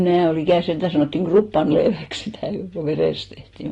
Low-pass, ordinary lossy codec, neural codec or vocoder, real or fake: 10.8 kHz; AAC, 32 kbps; none; real